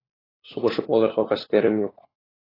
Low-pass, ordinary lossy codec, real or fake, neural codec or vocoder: 5.4 kHz; AAC, 24 kbps; fake; codec, 16 kHz, 4 kbps, FunCodec, trained on LibriTTS, 50 frames a second